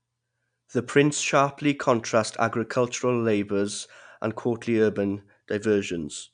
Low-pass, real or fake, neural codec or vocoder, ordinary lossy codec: 9.9 kHz; real; none; none